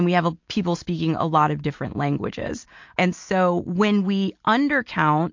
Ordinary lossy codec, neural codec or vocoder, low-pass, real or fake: MP3, 48 kbps; none; 7.2 kHz; real